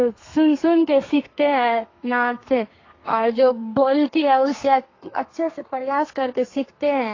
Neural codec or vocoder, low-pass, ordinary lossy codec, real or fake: codec, 32 kHz, 1.9 kbps, SNAC; 7.2 kHz; AAC, 32 kbps; fake